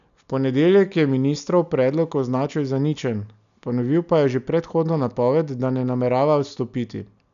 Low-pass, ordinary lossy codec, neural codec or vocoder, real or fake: 7.2 kHz; none; none; real